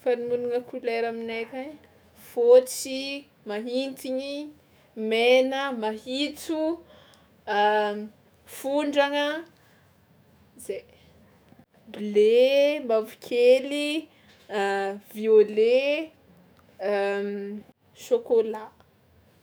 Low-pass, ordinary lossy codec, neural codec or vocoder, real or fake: none; none; autoencoder, 48 kHz, 128 numbers a frame, DAC-VAE, trained on Japanese speech; fake